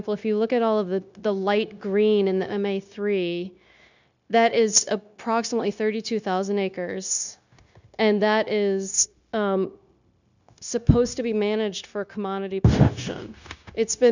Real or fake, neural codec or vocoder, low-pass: fake; codec, 16 kHz, 0.9 kbps, LongCat-Audio-Codec; 7.2 kHz